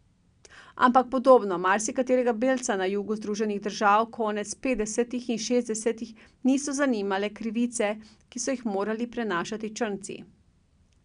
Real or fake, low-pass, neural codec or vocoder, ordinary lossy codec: real; 9.9 kHz; none; none